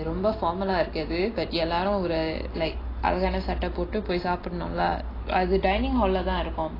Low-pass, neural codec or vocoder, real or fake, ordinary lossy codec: 5.4 kHz; none; real; AAC, 24 kbps